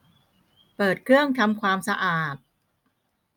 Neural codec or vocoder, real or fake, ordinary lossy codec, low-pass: none; real; none; none